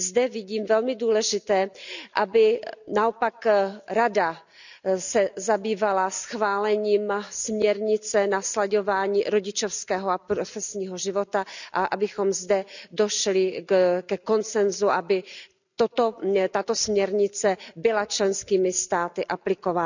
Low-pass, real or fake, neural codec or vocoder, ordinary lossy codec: 7.2 kHz; real; none; none